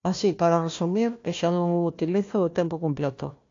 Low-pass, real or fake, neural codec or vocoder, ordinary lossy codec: 7.2 kHz; fake; codec, 16 kHz, 1 kbps, FunCodec, trained on Chinese and English, 50 frames a second; AAC, 48 kbps